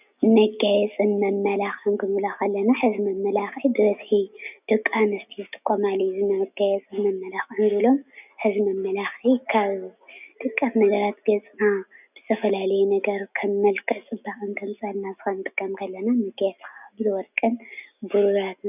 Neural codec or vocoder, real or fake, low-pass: none; real; 3.6 kHz